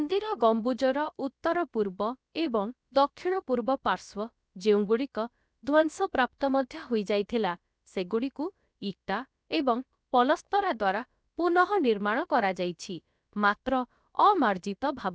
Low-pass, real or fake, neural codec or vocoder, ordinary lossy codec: none; fake; codec, 16 kHz, about 1 kbps, DyCAST, with the encoder's durations; none